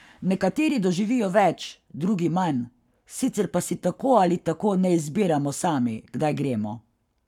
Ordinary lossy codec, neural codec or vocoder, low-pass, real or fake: none; codec, 44.1 kHz, 7.8 kbps, Pupu-Codec; 19.8 kHz; fake